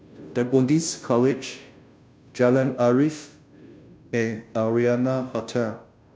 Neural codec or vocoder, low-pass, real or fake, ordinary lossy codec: codec, 16 kHz, 0.5 kbps, FunCodec, trained on Chinese and English, 25 frames a second; none; fake; none